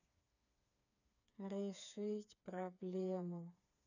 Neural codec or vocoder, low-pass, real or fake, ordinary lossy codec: codec, 16 kHz, 4 kbps, FreqCodec, smaller model; 7.2 kHz; fake; none